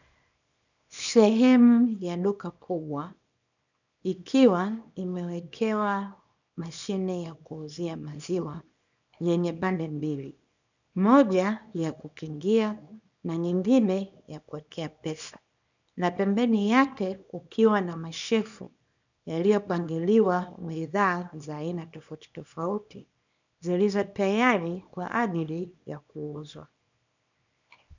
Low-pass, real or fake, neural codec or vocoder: 7.2 kHz; fake; codec, 24 kHz, 0.9 kbps, WavTokenizer, small release